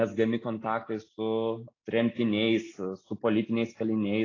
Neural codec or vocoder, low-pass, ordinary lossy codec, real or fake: none; 7.2 kHz; AAC, 32 kbps; real